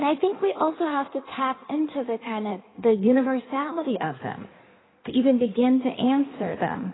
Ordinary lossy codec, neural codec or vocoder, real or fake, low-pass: AAC, 16 kbps; codec, 16 kHz in and 24 kHz out, 1.1 kbps, FireRedTTS-2 codec; fake; 7.2 kHz